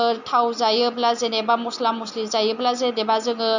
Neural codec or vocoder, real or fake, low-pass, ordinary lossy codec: none; real; 7.2 kHz; none